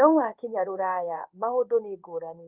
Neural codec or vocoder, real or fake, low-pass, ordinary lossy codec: codec, 16 kHz in and 24 kHz out, 1 kbps, XY-Tokenizer; fake; 3.6 kHz; Opus, 32 kbps